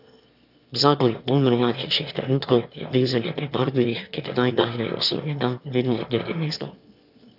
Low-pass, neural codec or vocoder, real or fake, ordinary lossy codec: 5.4 kHz; autoencoder, 22.05 kHz, a latent of 192 numbers a frame, VITS, trained on one speaker; fake; none